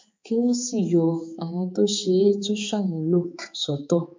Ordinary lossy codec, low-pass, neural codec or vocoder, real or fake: MP3, 48 kbps; 7.2 kHz; codec, 16 kHz, 4 kbps, X-Codec, HuBERT features, trained on balanced general audio; fake